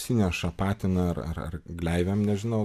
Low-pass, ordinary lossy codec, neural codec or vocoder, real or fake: 14.4 kHz; MP3, 96 kbps; none; real